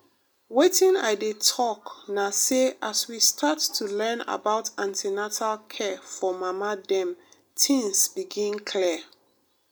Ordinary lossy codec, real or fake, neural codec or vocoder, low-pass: none; real; none; none